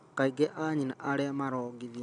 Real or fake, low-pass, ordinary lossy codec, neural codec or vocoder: real; 9.9 kHz; none; none